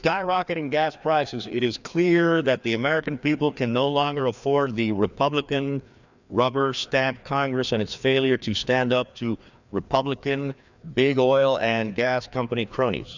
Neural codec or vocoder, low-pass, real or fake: codec, 16 kHz, 2 kbps, FreqCodec, larger model; 7.2 kHz; fake